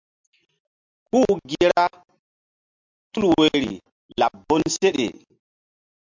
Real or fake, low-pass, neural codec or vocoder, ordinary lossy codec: real; 7.2 kHz; none; MP3, 64 kbps